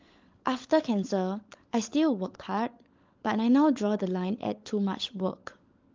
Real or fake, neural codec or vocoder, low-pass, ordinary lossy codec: fake; codec, 16 kHz, 16 kbps, FunCodec, trained on LibriTTS, 50 frames a second; 7.2 kHz; Opus, 32 kbps